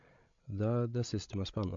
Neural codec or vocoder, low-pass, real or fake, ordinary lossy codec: codec, 16 kHz, 16 kbps, FreqCodec, larger model; 7.2 kHz; fake; MP3, 48 kbps